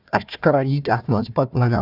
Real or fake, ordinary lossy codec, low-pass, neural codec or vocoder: fake; none; 5.4 kHz; codec, 16 kHz, 1 kbps, FunCodec, trained on Chinese and English, 50 frames a second